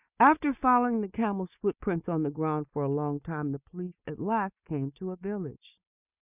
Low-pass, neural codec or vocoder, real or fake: 3.6 kHz; none; real